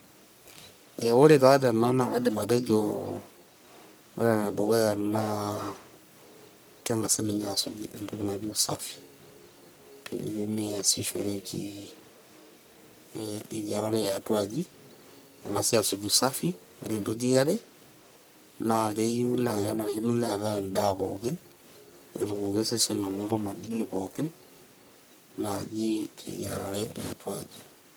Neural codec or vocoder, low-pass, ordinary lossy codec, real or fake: codec, 44.1 kHz, 1.7 kbps, Pupu-Codec; none; none; fake